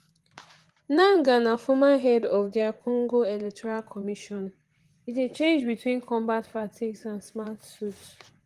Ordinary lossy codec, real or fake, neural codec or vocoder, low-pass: Opus, 24 kbps; fake; vocoder, 44.1 kHz, 128 mel bands, Pupu-Vocoder; 14.4 kHz